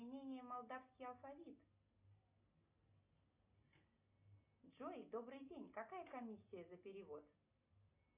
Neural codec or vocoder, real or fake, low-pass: none; real; 3.6 kHz